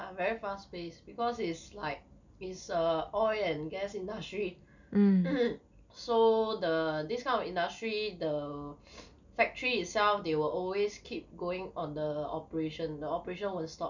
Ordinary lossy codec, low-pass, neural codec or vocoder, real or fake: none; 7.2 kHz; none; real